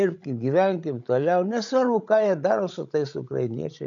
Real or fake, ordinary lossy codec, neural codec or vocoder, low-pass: fake; MP3, 64 kbps; codec, 16 kHz, 16 kbps, FreqCodec, larger model; 7.2 kHz